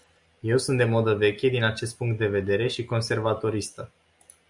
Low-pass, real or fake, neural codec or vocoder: 10.8 kHz; real; none